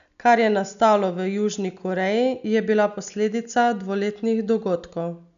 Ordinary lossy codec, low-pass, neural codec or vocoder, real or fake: none; 7.2 kHz; none; real